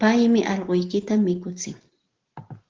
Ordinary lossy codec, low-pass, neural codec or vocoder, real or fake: Opus, 16 kbps; 7.2 kHz; none; real